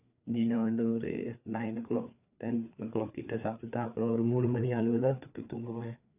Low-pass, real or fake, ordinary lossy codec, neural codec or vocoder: 3.6 kHz; fake; none; codec, 16 kHz, 4 kbps, FreqCodec, larger model